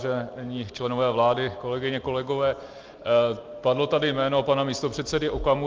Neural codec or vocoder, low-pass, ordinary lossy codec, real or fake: none; 7.2 kHz; Opus, 24 kbps; real